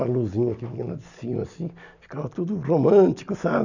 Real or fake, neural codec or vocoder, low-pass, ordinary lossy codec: real; none; 7.2 kHz; none